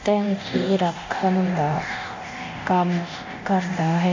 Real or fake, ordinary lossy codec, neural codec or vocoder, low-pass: fake; MP3, 48 kbps; codec, 24 kHz, 0.9 kbps, DualCodec; 7.2 kHz